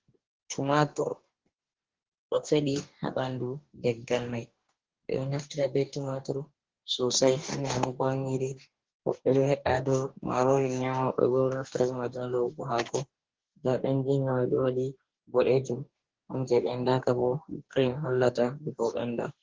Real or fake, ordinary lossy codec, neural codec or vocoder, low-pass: fake; Opus, 16 kbps; codec, 44.1 kHz, 2.6 kbps, DAC; 7.2 kHz